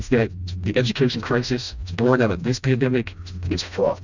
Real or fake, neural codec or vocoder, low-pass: fake; codec, 16 kHz, 1 kbps, FreqCodec, smaller model; 7.2 kHz